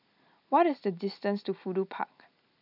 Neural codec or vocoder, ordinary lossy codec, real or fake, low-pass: none; none; real; 5.4 kHz